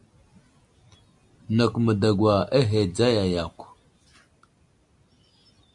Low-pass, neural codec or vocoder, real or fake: 10.8 kHz; none; real